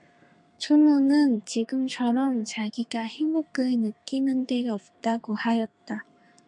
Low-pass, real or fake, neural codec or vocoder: 10.8 kHz; fake; codec, 32 kHz, 1.9 kbps, SNAC